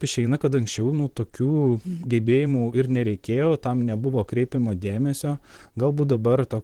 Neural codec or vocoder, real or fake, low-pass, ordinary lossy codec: vocoder, 44.1 kHz, 128 mel bands, Pupu-Vocoder; fake; 19.8 kHz; Opus, 16 kbps